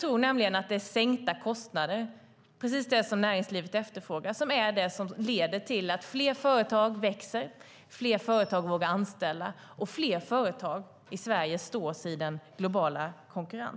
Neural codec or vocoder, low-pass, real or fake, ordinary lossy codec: none; none; real; none